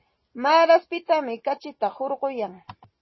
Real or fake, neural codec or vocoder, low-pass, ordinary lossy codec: real; none; 7.2 kHz; MP3, 24 kbps